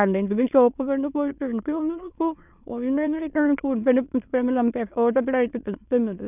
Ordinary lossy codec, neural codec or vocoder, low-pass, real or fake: none; autoencoder, 22.05 kHz, a latent of 192 numbers a frame, VITS, trained on many speakers; 3.6 kHz; fake